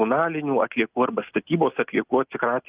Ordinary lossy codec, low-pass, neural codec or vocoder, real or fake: Opus, 24 kbps; 3.6 kHz; none; real